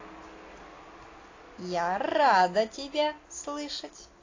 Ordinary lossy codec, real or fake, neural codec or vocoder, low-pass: AAC, 32 kbps; real; none; 7.2 kHz